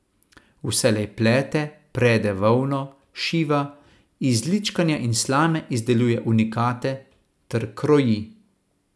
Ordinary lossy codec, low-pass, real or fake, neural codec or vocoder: none; none; real; none